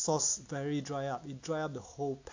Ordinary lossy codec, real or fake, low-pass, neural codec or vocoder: AAC, 48 kbps; real; 7.2 kHz; none